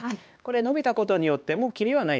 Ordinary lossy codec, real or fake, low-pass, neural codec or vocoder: none; fake; none; codec, 16 kHz, 2 kbps, X-Codec, HuBERT features, trained on LibriSpeech